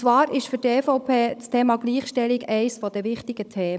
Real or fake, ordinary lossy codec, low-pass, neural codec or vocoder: fake; none; none; codec, 16 kHz, 16 kbps, FunCodec, trained on Chinese and English, 50 frames a second